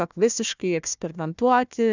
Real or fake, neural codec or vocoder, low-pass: fake; codec, 16 kHz, 1 kbps, FunCodec, trained on Chinese and English, 50 frames a second; 7.2 kHz